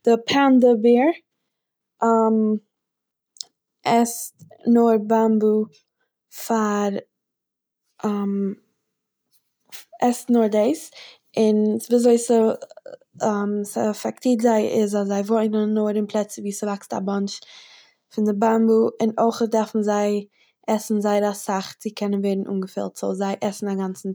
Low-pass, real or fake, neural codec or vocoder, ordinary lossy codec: none; real; none; none